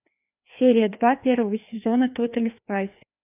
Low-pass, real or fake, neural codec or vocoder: 3.6 kHz; fake; codec, 16 kHz, 2 kbps, FreqCodec, larger model